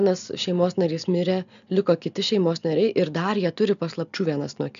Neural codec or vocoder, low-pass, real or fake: none; 7.2 kHz; real